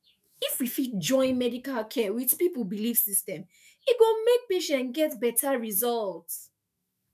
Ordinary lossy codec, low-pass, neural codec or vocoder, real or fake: none; 14.4 kHz; autoencoder, 48 kHz, 128 numbers a frame, DAC-VAE, trained on Japanese speech; fake